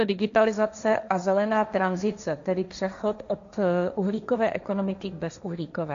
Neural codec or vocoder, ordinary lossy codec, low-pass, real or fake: codec, 16 kHz, 1.1 kbps, Voila-Tokenizer; MP3, 64 kbps; 7.2 kHz; fake